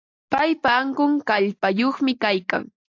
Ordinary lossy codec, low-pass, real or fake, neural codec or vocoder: AAC, 48 kbps; 7.2 kHz; real; none